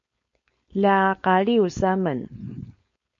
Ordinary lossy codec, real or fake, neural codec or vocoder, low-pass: MP3, 48 kbps; fake; codec, 16 kHz, 4.8 kbps, FACodec; 7.2 kHz